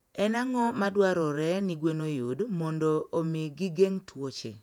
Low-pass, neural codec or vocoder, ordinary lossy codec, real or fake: 19.8 kHz; vocoder, 48 kHz, 128 mel bands, Vocos; none; fake